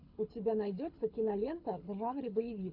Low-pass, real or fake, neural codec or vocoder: 5.4 kHz; fake; codec, 24 kHz, 6 kbps, HILCodec